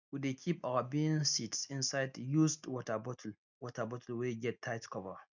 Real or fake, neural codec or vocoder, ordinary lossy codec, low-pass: real; none; none; 7.2 kHz